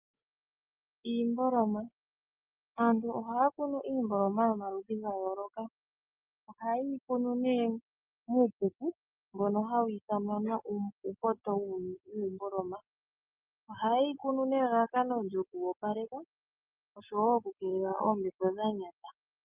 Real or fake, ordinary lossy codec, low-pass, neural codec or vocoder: real; Opus, 24 kbps; 3.6 kHz; none